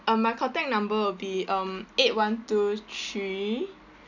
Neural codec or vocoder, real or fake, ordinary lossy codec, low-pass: none; real; none; 7.2 kHz